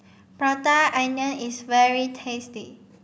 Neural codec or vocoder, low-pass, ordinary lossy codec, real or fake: none; none; none; real